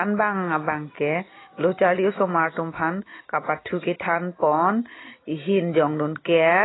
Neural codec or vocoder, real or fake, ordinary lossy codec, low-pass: none; real; AAC, 16 kbps; 7.2 kHz